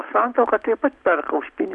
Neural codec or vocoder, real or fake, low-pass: none; real; 10.8 kHz